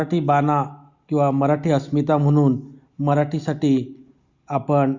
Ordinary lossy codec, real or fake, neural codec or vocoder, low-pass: Opus, 64 kbps; real; none; 7.2 kHz